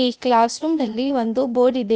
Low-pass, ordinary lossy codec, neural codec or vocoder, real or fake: none; none; codec, 16 kHz, 0.8 kbps, ZipCodec; fake